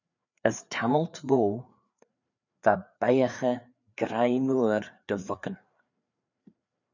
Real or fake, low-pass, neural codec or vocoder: fake; 7.2 kHz; codec, 16 kHz, 4 kbps, FreqCodec, larger model